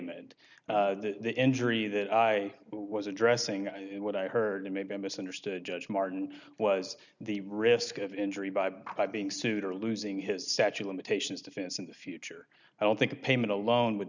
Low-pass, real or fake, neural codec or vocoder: 7.2 kHz; real; none